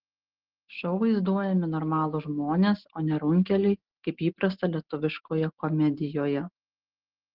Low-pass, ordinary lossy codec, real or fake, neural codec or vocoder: 5.4 kHz; Opus, 16 kbps; real; none